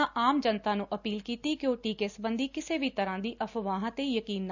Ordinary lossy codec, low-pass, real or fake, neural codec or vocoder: none; 7.2 kHz; real; none